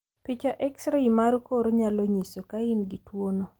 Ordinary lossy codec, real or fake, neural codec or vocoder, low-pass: Opus, 24 kbps; real; none; 19.8 kHz